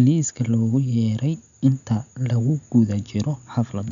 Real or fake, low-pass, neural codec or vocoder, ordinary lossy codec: real; 7.2 kHz; none; none